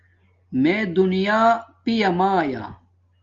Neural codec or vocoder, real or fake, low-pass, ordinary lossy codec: none; real; 7.2 kHz; Opus, 32 kbps